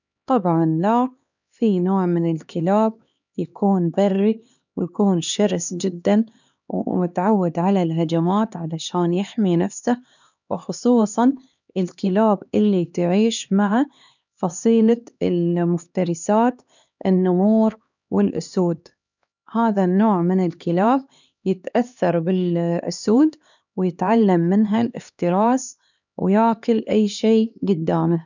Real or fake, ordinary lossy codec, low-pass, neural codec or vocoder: fake; none; 7.2 kHz; codec, 16 kHz, 2 kbps, X-Codec, HuBERT features, trained on LibriSpeech